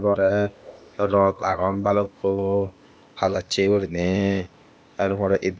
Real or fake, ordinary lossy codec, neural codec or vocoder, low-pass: fake; none; codec, 16 kHz, 0.8 kbps, ZipCodec; none